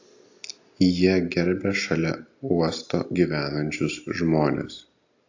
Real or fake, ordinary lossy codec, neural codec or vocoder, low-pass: real; AAC, 48 kbps; none; 7.2 kHz